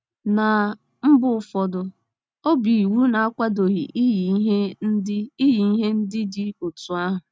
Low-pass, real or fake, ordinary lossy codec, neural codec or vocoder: none; real; none; none